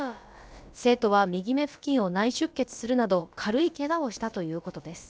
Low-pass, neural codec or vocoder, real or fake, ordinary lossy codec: none; codec, 16 kHz, about 1 kbps, DyCAST, with the encoder's durations; fake; none